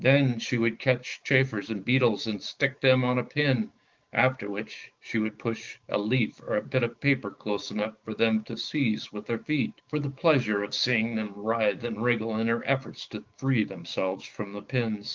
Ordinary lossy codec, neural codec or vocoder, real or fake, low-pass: Opus, 32 kbps; codec, 16 kHz, 6 kbps, DAC; fake; 7.2 kHz